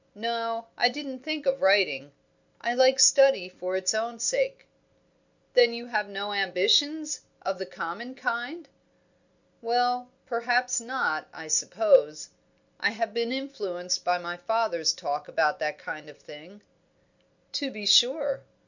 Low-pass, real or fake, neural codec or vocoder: 7.2 kHz; real; none